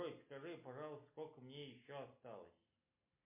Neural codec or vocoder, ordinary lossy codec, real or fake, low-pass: none; MP3, 24 kbps; real; 3.6 kHz